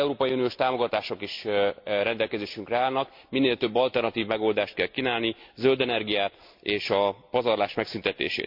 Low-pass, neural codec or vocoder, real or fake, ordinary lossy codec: 5.4 kHz; none; real; MP3, 48 kbps